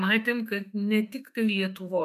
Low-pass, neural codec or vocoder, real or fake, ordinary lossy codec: 14.4 kHz; autoencoder, 48 kHz, 32 numbers a frame, DAC-VAE, trained on Japanese speech; fake; MP3, 96 kbps